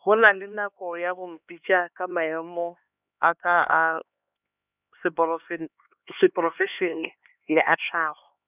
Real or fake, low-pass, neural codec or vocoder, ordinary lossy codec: fake; 3.6 kHz; codec, 16 kHz, 2 kbps, X-Codec, HuBERT features, trained on LibriSpeech; none